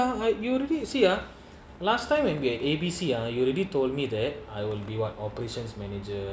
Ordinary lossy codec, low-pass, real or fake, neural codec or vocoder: none; none; real; none